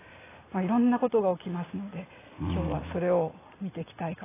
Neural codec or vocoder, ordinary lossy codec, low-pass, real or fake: none; AAC, 16 kbps; 3.6 kHz; real